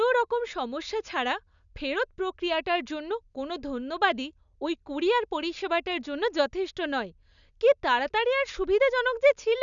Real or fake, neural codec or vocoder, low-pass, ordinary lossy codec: real; none; 7.2 kHz; none